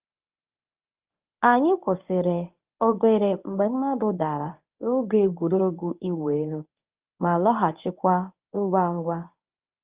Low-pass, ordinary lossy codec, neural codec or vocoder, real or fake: 3.6 kHz; Opus, 24 kbps; codec, 24 kHz, 0.9 kbps, WavTokenizer, medium speech release version 1; fake